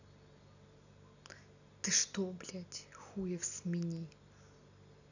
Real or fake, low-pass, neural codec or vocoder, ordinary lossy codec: real; 7.2 kHz; none; none